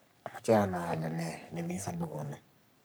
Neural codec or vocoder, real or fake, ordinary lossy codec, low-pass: codec, 44.1 kHz, 3.4 kbps, Pupu-Codec; fake; none; none